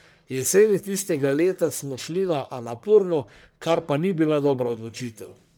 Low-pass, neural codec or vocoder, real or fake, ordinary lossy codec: none; codec, 44.1 kHz, 1.7 kbps, Pupu-Codec; fake; none